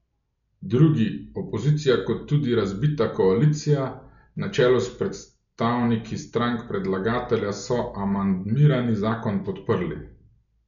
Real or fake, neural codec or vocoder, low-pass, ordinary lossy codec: real; none; 7.2 kHz; none